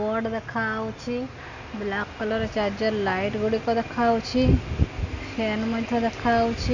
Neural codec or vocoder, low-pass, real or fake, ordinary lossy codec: none; 7.2 kHz; real; none